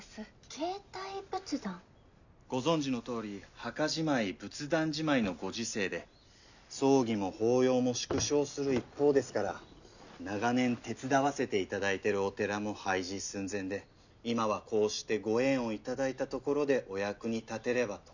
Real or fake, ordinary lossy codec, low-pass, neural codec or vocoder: real; none; 7.2 kHz; none